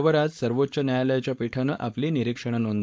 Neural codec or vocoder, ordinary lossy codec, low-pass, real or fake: codec, 16 kHz, 4.8 kbps, FACodec; none; none; fake